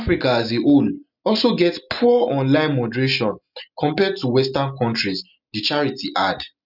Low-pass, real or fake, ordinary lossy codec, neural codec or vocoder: 5.4 kHz; real; none; none